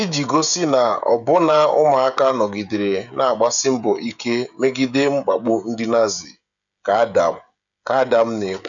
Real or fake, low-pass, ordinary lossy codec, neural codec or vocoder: fake; 7.2 kHz; none; codec, 16 kHz, 16 kbps, FreqCodec, smaller model